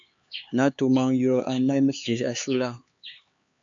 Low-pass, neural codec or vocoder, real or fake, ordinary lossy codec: 7.2 kHz; codec, 16 kHz, 4 kbps, X-Codec, HuBERT features, trained on LibriSpeech; fake; AAC, 64 kbps